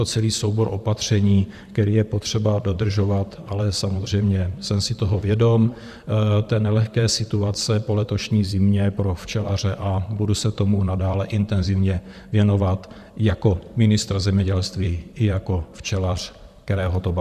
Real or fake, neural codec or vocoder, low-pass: fake; vocoder, 44.1 kHz, 128 mel bands, Pupu-Vocoder; 14.4 kHz